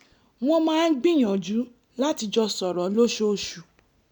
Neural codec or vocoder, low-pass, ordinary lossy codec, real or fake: none; 19.8 kHz; none; real